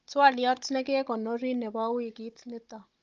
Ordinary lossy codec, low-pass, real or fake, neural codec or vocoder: Opus, 24 kbps; 7.2 kHz; fake; codec, 16 kHz, 16 kbps, FunCodec, trained on Chinese and English, 50 frames a second